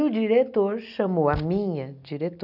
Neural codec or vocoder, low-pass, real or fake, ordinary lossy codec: none; 5.4 kHz; real; none